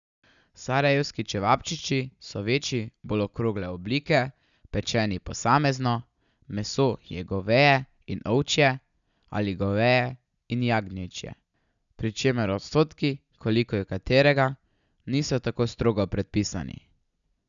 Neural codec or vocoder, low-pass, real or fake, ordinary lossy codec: none; 7.2 kHz; real; none